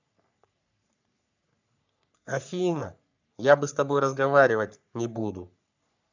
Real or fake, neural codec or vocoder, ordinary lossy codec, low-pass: fake; codec, 44.1 kHz, 3.4 kbps, Pupu-Codec; none; 7.2 kHz